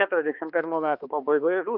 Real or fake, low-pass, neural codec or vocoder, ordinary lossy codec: fake; 5.4 kHz; codec, 16 kHz, 2 kbps, X-Codec, HuBERT features, trained on balanced general audio; Opus, 32 kbps